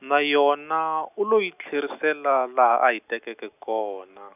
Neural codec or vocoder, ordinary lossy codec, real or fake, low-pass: none; none; real; 3.6 kHz